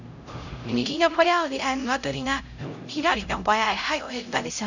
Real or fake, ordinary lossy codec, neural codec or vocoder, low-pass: fake; none; codec, 16 kHz, 0.5 kbps, X-Codec, HuBERT features, trained on LibriSpeech; 7.2 kHz